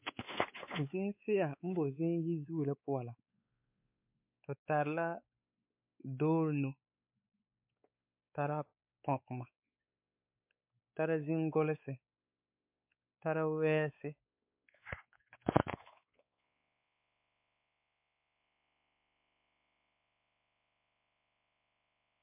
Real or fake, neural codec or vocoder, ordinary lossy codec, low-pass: fake; codec, 16 kHz, 4 kbps, X-Codec, WavLM features, trained on Multilingual LibriSpeech; MP3, 32 kbps; 3.6 kHz